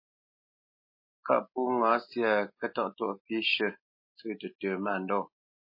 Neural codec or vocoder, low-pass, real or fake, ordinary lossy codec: none; 5.4 kHz; real; MP3, 24 kbps